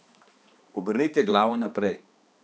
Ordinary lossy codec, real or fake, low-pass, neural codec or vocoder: none; fake; none; codec, 16 kHz, 2 kbps, X-Codec, HuBERT features, trained on balanced general audio